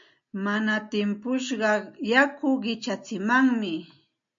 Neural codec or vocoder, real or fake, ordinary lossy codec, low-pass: none; real; MP3, 48 kbps; 7.2 kHz